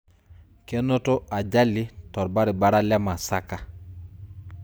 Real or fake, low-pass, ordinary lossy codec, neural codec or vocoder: real; none; none; none